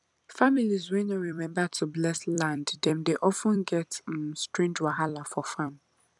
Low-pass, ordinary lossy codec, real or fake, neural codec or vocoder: 10.8 kHz; none; fake; vocoder, 44.1 kHz, 128 mel bands every 256 samples, BigVGAN v2